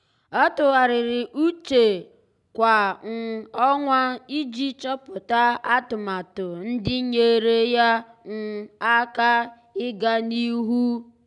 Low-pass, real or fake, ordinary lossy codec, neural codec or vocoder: 10.8 kHz; real; none; none